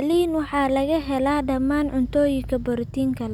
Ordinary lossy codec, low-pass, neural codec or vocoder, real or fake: none; 19.8 kHz; none; real